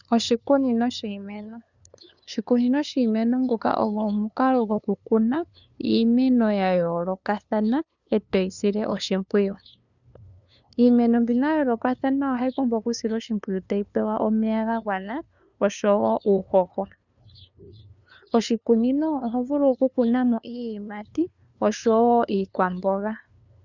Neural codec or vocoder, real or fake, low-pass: codec, 16 kHz, 2 kbps, FunCodec, trained on LibriTTS, 25 frames a second; fake; 7.2 kHz